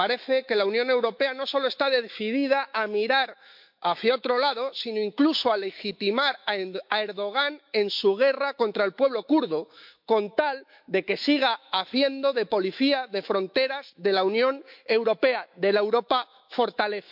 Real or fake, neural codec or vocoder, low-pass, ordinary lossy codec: fake; autoencoder, 48 kHz, 128 numbers a frame, DAC-VAE, trained on Japanese speech; 5.4 kHz; MP3, 48 kbps